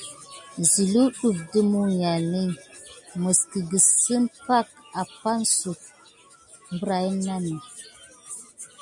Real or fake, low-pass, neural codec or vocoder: real; 10.8 kHz; none